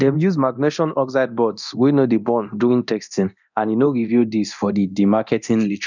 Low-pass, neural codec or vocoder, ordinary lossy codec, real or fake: 7.2 kHz; codec, 24 kHz, 0.9 kbps, DualCodec; none; fake